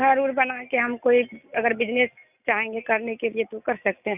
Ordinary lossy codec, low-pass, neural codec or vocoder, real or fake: none; 3.6 kHz; none; real